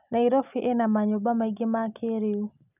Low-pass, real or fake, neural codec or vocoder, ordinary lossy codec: 3.6 kHz; real; none; none